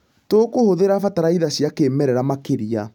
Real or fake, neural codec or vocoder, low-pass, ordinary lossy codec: real; none; 19.8 kHz; none